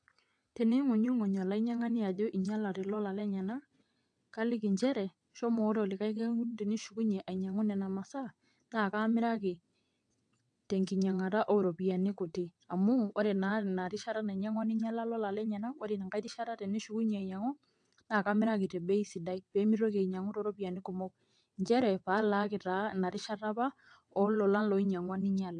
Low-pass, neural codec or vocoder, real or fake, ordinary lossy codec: 9.9 kHz; vocoder, 22.05 kHz, 80 mel bands, WaveNeXt; fake; AAC, 64 kbps